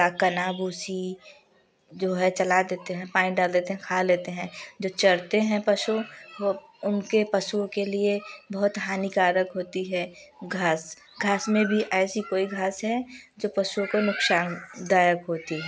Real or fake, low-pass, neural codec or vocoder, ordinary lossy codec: real; none; none; none